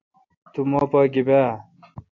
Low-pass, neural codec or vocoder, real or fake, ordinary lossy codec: 7.2 kHz; none; real; AAC, 48 kbps